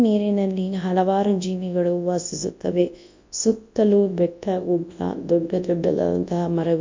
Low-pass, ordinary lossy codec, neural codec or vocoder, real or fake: 7.2 kHz; MP3, 48 kbps; codec, 24 kHz, 0.9 kbps, WavTokenizer, large speech release; fake